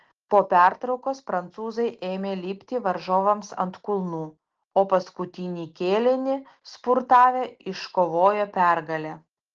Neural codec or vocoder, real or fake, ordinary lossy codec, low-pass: none; real; Opus, 16 kbps; 7.2 kHz